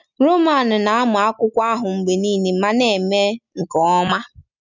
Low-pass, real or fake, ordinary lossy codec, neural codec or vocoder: 7.2 kHz; real; none; none